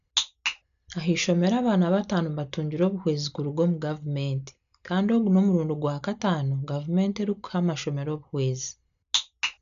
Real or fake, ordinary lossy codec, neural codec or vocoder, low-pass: real; none; none; 7.2 kHz